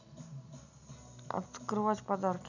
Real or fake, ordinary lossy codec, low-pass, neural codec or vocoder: real; none; 7.2 kHz; none